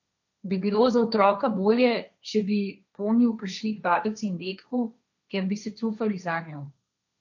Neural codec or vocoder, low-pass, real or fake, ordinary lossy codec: codec, 16 kHz, 1.1 kbps, Voila-Tokenizer; 7.2 kHz; fake; none